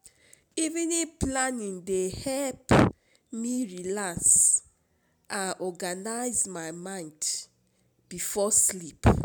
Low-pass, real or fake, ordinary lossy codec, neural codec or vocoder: none; real; none; none